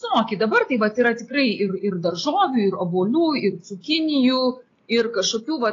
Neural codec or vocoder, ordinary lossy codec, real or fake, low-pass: none; AAC, 32 kbps; real; 7.2 kHz